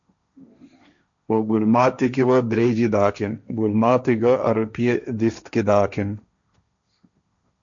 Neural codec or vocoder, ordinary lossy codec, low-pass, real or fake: codec, 16 kHz, 1.1 kbps, Voila-Tokenizer; MP3, 96 kbps; 7.2 kHz; fake